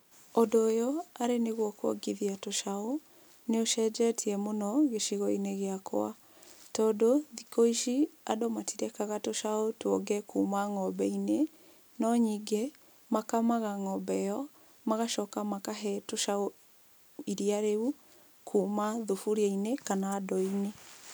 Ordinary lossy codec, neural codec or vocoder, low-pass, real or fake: none; none; none; real